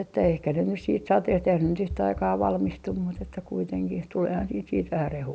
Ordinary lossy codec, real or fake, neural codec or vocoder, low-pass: none; real; none; none